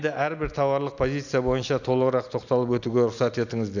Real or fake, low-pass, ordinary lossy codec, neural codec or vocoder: real; 7.2 kHz; none; none